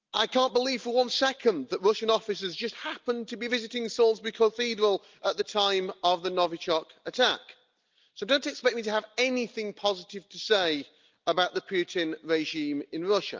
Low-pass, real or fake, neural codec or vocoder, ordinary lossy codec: 7.2 kHz; real; none; Opus, 24 kbps